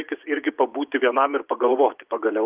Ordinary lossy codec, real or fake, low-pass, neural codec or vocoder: Opus, 24 kbps; fake; 3.6 kHz; vocoder, 24 kHz, 100 mel bands, Vocos